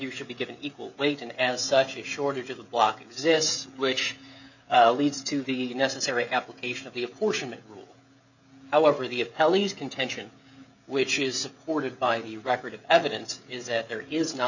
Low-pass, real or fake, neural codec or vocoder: 7.2 kHz; fake; codec, 16 kHz, 16 kbps, FreqCodec, smaller model